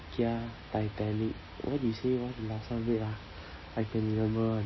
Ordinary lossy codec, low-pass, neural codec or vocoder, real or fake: MP3, 24 kbps; 7.2 kHz; none; real